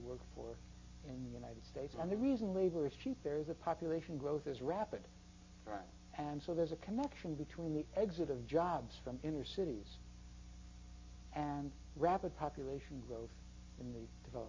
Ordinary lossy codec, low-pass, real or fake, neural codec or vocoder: MP3, 32 kbps; 7.2 kHz; real; none